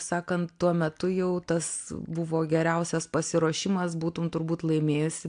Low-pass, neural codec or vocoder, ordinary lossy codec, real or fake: 9.9 kHz; none; AAC, 64 kbps; real